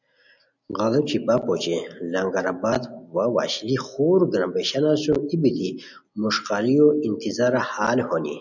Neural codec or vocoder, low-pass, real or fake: none; 7.2 kHz; real